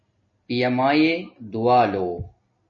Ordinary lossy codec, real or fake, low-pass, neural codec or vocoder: MP3, 32 kbps; real; 7.2 kHz; none